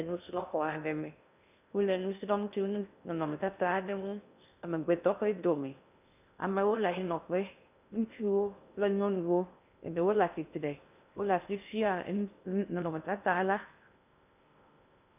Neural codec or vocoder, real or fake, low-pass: codec, 16 kHz in and 24 kHz out, 0.6 kbps, FocalCodec, streaming, 2048 codes; fake; 3.6 kHz